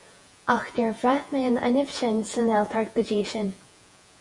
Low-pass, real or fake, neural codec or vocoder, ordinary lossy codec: 10.8 kHz; fake; vocoder, 48 kHz, 128 mel bands, Vocos; Opus, 64 kbps